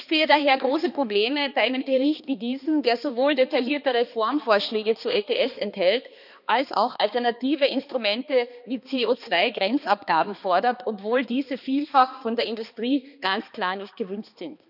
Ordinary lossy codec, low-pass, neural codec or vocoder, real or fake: none; 5.4 kHz; codec, 16 kHz, 2 kbps, X-Codec, HuBERT features, trained on balanced general audio; fake